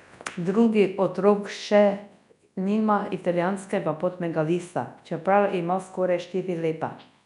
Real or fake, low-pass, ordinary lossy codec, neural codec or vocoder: fake; 10.8 kHz; none; codec, 24 kHz, 0.9 kbps, WavTokenizer, large speech release